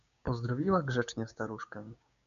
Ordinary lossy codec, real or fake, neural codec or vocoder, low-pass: MP3, 96 kbps; fake; codec, 16 kHz, 6 kbps, DAC; 7.2 kHz